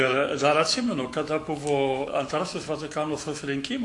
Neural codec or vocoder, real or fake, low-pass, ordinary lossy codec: autoencoder, 48 kHz, 128 numbers a frame, DAC-VAE, trained on Japanese speech; fake; 10.8 kHz; AAC, 64 kbps